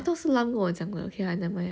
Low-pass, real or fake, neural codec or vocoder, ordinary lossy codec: none; real; none; none